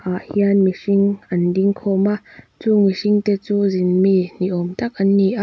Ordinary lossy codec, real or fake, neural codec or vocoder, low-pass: none; real; none; none